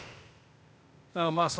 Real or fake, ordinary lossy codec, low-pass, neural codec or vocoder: fake; none; none; codec, 16 kHz, 0.8 kbps, ZipCodec